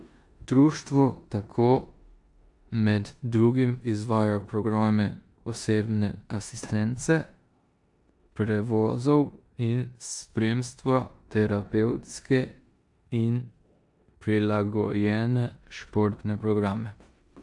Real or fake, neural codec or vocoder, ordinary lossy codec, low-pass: fake; codec, 16 kHz in and 24 kHz out, 0.9 kbps, LongCat-Audio-Codec, four codebook decoder; AAC, 64 kbps; 10.8 kHz